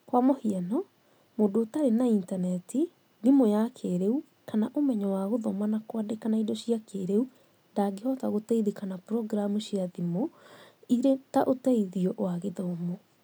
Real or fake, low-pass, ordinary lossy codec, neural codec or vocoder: real; none; none; none